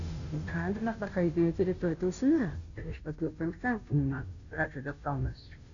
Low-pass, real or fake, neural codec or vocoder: 7.2 kHz; fake; codec, 16 kHz, 0.5 kbps, FunCodec, trained on Chinese and English, 25 frames a second